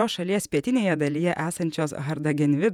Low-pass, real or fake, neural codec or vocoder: 19.8 kHz; fake; vocoder, 48 kHz, 128 mel bands, Vocos